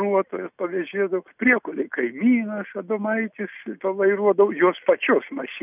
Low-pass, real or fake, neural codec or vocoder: 3.6 kHz; real; none